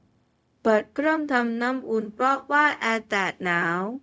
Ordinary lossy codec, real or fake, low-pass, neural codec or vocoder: none; fake; none; codec, 16 kHz, 0.4 kbps, LongCat-Audio-Codec